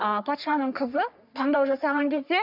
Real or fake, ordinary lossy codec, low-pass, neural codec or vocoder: fake; none; 5.4 kHz; codec, 44.1 kHz, 3.4 kbps, Pupu-Codec